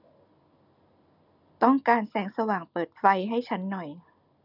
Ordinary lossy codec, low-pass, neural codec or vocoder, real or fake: none; 5.4 kHz; none; real